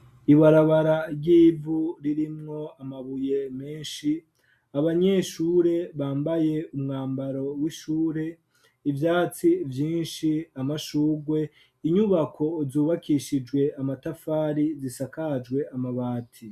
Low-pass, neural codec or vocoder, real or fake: 14.4 kHz; none; real